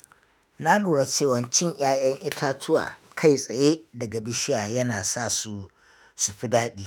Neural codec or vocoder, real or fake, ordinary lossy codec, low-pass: autoencoder, 48 kHz, 32 numbers a frame, DAC-VAE, trained on Japanese speech; fake; none; none